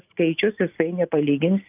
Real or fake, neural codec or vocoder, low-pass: real; none; 3.6 kHz